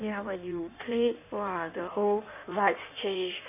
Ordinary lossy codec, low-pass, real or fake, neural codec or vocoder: none; 3.6 kHz; fake; codec, 16 kHz in and 24 kHz out, 1.1 kbps, FireRedTTS-2 codec